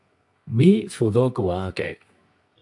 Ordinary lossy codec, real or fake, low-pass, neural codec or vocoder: AAC, 64 kbps; fake; 10.8 kHz; codec, 24 kHz, 0.9 kbps, WavTokenizer, medium music audio release